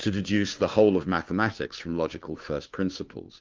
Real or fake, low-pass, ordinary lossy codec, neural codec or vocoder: fake; 7.2 kHz; Opus, 32 kbps; codec, 16 kHz, 2 kbps, FunCodec, trained on Chinese and English, 25 frames a second